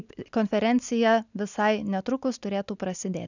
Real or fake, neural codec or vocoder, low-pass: real; none; 7.2 kHz